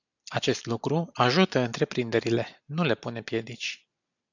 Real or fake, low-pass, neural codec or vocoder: real; 7.2 kHz; none